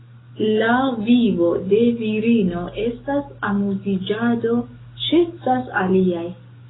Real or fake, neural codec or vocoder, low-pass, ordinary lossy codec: fake; codec, 44.1 kHz, 7.8 kbps, DAC; 7.2 kHz; AAC, 16 kbps